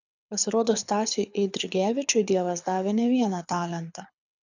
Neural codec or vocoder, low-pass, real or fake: codec, 24 kHz, 6 kbps, HILCodec; 7.2 kHz; fake